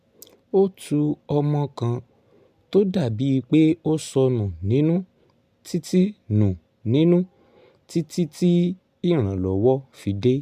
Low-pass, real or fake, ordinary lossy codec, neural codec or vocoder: 14.4 kHz; real; MP3, 96 kbps; none